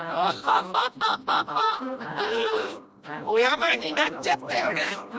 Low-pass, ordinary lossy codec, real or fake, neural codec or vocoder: none; none; fake; codec, 16 kHz, 1 kbps, FreqCodec, smaller model